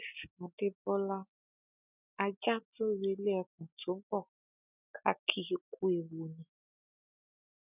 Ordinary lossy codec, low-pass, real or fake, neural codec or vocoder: none; 3.6 kHz; real; none